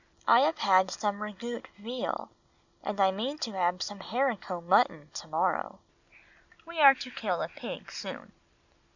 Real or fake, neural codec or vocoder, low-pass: real; none; 7.2 kHz